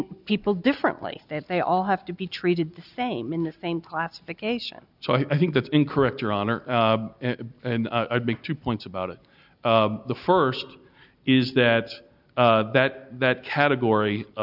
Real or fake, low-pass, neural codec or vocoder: real; 5.4 kHz; none